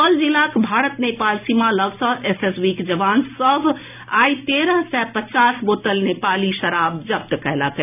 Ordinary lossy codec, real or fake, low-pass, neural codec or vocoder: none; real; 3.6 kHz; none